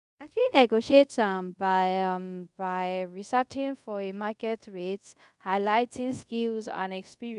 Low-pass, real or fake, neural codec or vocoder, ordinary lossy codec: 10.8 kHz; fake; codec, 24 kHz, 0.5 kbps, DualCodec; none